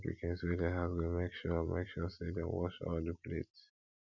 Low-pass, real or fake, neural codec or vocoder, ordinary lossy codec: 7.2 kHz; real; none; none